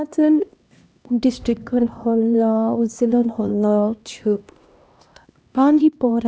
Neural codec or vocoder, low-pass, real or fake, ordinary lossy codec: codec, 16 kHz, 1 kbps, X-Codec, HuBERT features, trained on LibriSpeech; none; fake; none